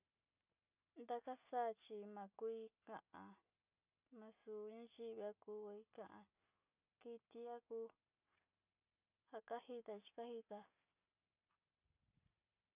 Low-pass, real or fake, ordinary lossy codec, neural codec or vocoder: 3.6 kHz; real; none; none